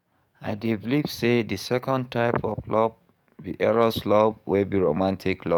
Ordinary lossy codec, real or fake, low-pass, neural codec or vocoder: none; fake; 19.8 kHz; codec, 44.1 kHz, 7.8 kbps, DAC